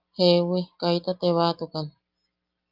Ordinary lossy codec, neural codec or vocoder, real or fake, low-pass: Opus, 24 kbps; none; real; 5.4 kHz